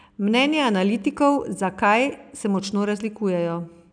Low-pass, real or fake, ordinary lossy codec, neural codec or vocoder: 9.9 kHz; real; none; none